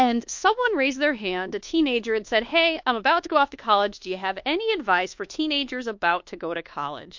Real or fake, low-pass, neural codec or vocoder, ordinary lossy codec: fake; 7.2 kHz; codec, 24 kHz, 1.2 kbps, DualCodec; MP3, 48 kbps